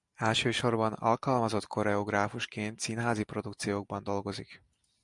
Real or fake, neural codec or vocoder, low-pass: real; none; 10.8 kHz